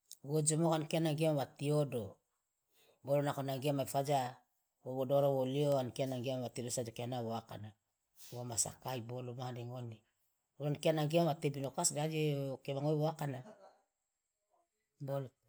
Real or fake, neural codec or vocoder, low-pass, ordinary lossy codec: real; none; none; none